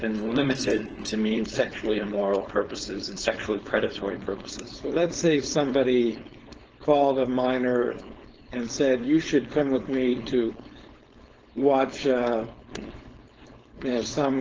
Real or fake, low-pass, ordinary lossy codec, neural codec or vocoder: fake; 7.2 kHz; Opus, 16 kbps; codec, 16 kHz, 4.8 kbps, FACodec